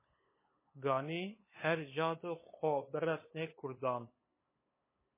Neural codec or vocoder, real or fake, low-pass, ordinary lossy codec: codec, 16 kHz, 8 kbps, FunCodec, trained on LibriTTS, 25 frames a second; fake; 3.6 kHz; MP3, 16 kbps